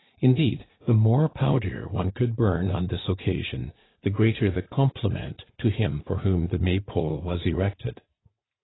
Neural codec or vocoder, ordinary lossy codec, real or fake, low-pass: vocoder, 44.1 kHz, 80 mel bands, Vocos; AAC, 16 kbps; fake; 7.2 kHz